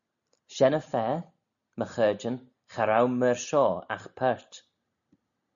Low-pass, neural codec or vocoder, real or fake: 7.2 kHz; none; real